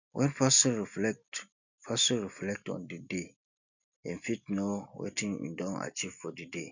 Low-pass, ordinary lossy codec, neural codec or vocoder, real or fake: 7.2 kHz; none; none; real